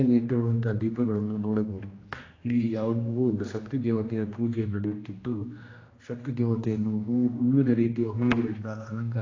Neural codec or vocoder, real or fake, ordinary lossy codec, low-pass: codec, 16 kHz, 1 kbps, X-Codec, HuBERT features, trained on general audio; fake; AAC, 32 kbps; 7.2 kHz